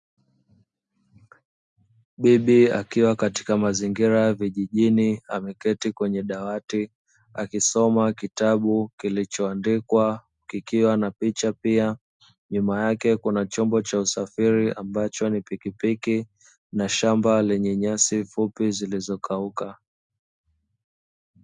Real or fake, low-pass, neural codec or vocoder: real; 10.8 kHz; none